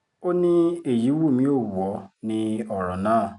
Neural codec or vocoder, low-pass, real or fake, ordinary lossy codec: none; 10.8 kHz; real; none